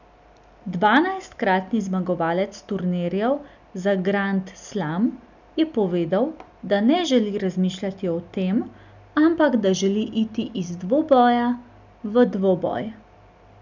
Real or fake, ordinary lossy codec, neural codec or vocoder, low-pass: real; none; none; 7.2 kHz